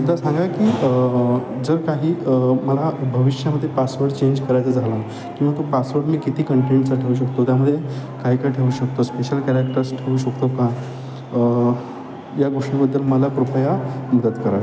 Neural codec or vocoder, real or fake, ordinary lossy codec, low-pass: none; real; none; none